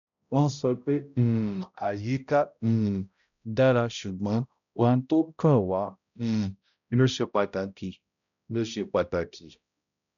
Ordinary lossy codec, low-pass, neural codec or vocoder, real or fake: none; 7.2 kHz; codec, 16 kHz, 0.5 kbps, X-Codec, HuBERT features, trained on balanced general audio; fake